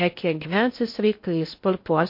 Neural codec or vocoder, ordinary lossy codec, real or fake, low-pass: codec, 16 kHz in and 24 kHz out, 0.6 kbps, FocalCodec, streaming, 4096 codes; MP3, 32 kbps; fake; 5.4 kHz